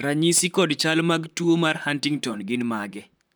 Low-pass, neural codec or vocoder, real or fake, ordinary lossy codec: none; vocoder, 44.1 kHz, 128 mel bands, Pupu-Vocoder; fake; none